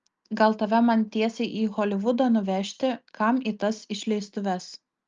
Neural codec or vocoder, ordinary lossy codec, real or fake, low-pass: none; Opus, 24 kbps; real; 7.2 kHz